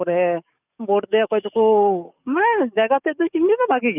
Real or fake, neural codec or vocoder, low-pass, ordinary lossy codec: fake; codec, 44.1 kHz, 7.8 kbps, DAC; 3.6 kHz; none